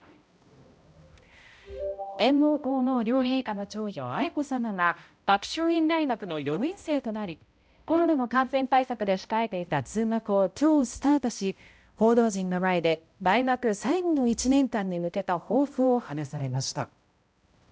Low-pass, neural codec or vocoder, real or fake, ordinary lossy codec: none; codec, 16 kHz, 0.5 kbps, X-Codec, HuBERT features, trained on balanced general audio; fake; none